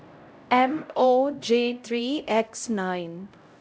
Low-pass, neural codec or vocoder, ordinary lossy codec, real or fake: none; codec, 16 kHz, 0.5 kbps, X-Codec, HuBERT features, trained on LibriSpeech; none; fake